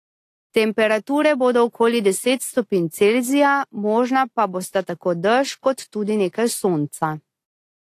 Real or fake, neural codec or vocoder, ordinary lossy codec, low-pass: real; none; AAC, 48 kbps; 14.4 kHz